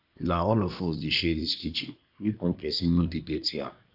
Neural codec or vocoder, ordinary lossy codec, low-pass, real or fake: codec, 24 kHz, 1 kbps, SNAC; AAC, 48 kbps; 5.4 kHz; fake